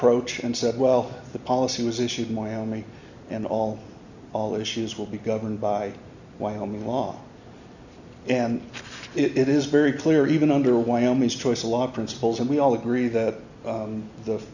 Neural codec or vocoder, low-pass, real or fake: none; 7.2 kHz; real